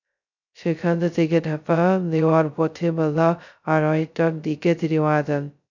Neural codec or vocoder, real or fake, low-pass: codec, 16 kHz, 0.2 kbps, FocalCodec; fake; 7.2 kHz